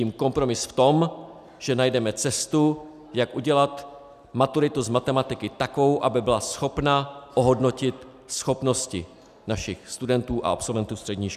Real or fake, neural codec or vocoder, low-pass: real; none; 14.4 kHz